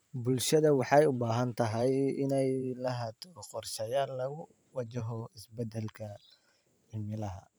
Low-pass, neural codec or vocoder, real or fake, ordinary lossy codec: none; vocoder, 44.1 kHz, 128 mel bands every 512 samples, BigVGAN v2; fake; none